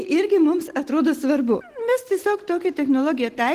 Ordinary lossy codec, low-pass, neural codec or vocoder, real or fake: Opus, 16 kbps; 14.4 kHz; none; real